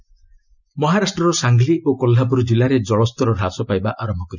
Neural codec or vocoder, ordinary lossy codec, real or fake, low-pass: none; none; real; 7.2 kHz